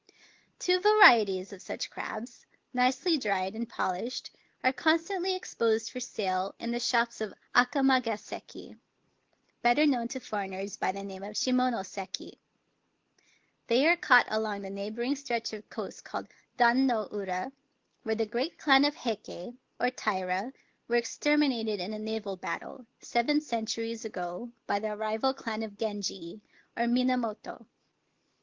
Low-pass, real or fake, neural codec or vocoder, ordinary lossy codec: 7.2 kHz; real; none; Opus, 16 kbps